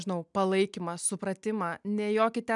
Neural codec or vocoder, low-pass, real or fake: none; 10.8 kHz; real